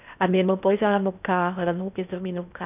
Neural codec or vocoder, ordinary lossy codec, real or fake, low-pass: codec, 16 kHz in and 24 kHz out, 0.6 kbps, FocalCodec, streaming, 4096 codes; none; fake; 3.6 kHz